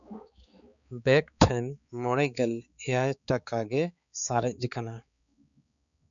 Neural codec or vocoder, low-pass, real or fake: codec, 16 kHz, 4 kbps, X-Codec, HuBERT features, trained on balanced general audio; 7.2 kHz; fake